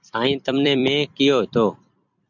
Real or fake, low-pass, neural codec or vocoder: fake; 7.2 kHz; vocoder, 44.1 kHz, 128 mel bands every 256 samples, BigVGAN v2